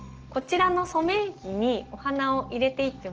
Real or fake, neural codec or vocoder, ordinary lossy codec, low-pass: real; none; Opus, 16 kbps; 7.2 kHz